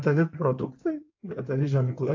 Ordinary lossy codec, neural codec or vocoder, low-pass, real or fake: AAC, 48 kbps; codec, 16 kHz in and 24 kHz out, 2.2 kbps, FireRedTTS-2 codec; 7.2 kHz; fake